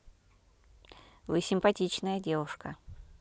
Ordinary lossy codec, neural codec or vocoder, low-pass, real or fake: none; none; none; real